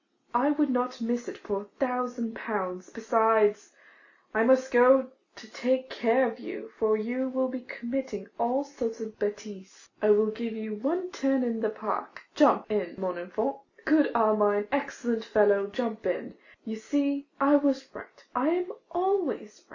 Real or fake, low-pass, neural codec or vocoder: real; 7.2 kHz; none